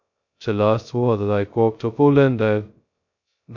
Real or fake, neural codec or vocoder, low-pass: fake; codec, 16 kHz, 0.2 kbps, FocalCodec; 7.2 kHz